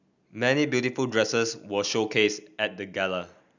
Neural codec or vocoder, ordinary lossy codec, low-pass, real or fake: none; none; 7.2 kHz; real